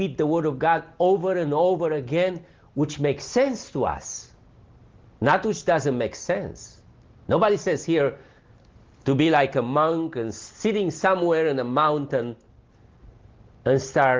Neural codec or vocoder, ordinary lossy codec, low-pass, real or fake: none; Opus, 16 kbps; 7.2 kHz; real